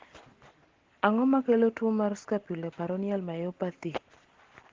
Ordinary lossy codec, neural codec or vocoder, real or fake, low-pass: Opus, 16 kbps; none; real; 7.2 kHz